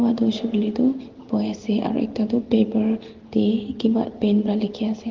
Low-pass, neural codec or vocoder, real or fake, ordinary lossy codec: 7.2 kHz; none; real; Opus, 16 kbps